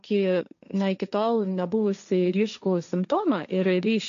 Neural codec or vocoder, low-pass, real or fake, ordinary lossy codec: codec, 16 kHz, 1.1 kbps, Voila-Tokenizer; 7.2 kHz; fake; MP3, 64 kbps